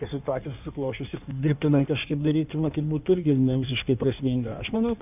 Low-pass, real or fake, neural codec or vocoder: 3.6 kHz; fake; codec, 16 kHz in and 24 kHz out, 1.1 kbps, FireRedTTS-2 codec